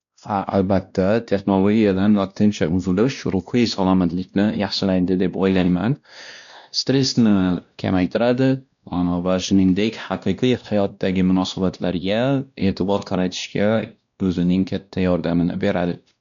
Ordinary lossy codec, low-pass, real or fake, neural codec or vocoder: none; 7.2 kHz; fake; codec, 16 kHz, 1 kbps, X-Codec, WavLM features, trained on Multilingual LibriSpeech